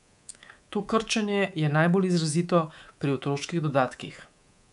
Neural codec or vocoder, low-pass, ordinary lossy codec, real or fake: codec, 24 kHz, 3.1 kbps, DualCodec; 10.8 kHz; none; fake